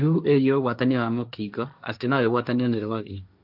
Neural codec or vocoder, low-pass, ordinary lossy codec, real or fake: codec, 16 kHz, 1.1 kbps, Voila-Tokenizer; 5.4 kHz; none; fake